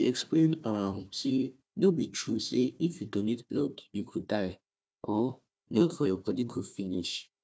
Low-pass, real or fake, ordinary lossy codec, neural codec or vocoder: none; fake; none; codec, 16 kHz, 1 kbps, FunCodec, trained on Chinese and English, 50 frames a second